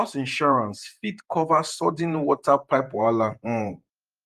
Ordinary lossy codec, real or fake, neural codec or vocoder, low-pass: Opus, 32 kbps; fake; vocoder, 48 kHz, 128 mel bands, Vocos; 14.4 kHz